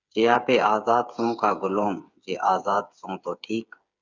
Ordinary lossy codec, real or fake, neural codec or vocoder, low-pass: Opus, 64 kbps; fake; codec, 16 kHz, 8 kbps, FreqCodec, smaller model; 7.2 kHz